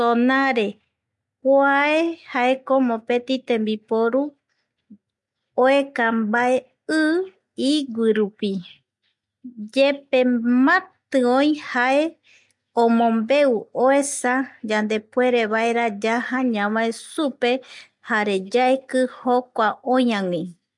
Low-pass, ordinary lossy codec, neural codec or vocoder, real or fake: 10.8 kHz; MP3, 64 kbps; none; real